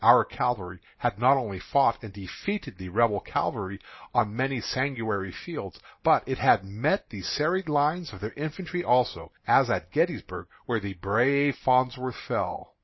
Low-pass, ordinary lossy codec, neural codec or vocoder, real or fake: 7.2 kHz; MP3, 24 kbps; none; real